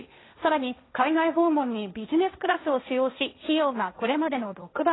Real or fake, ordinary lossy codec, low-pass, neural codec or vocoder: fake; AAC, 16 kbps; 7.2 kHz; codec, 16 kHz, 1.1 kbps, Voila-Tokenizer